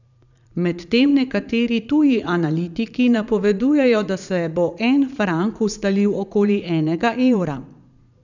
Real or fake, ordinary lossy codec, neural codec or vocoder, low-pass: fake; none; vocoder, 44.1 kHz, 80 mel bands, Vocos; 7.2 kHz